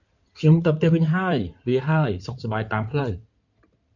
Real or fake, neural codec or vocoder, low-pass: fake; codec, 16 kHz in and 24 kHz out, 2.2 kbps, FireRedTTS-2 codec; 7.2 kHz